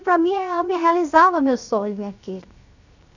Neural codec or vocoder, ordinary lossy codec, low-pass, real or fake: codec, 16 kHz, 0.7 kbps, FocalCodec; none; 7.2 kHz; fake